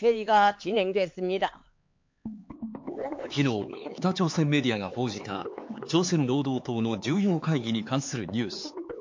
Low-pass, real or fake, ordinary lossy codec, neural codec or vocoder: 7.2 kHz; fake; MP3, 48 kbps; codec, 16 kHz, 4 kbps, X-Codec, HuBERT features, trained on LibriSpeech